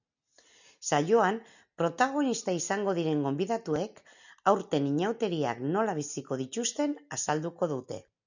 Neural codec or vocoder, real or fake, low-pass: none; real; 7.2 kHz